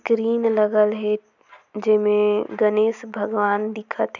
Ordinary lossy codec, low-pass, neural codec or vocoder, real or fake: none; 7.2 kHz; none; real